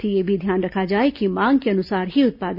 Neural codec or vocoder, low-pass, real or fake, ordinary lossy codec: none; 5.4 kHz; real; AAC, 48 kbps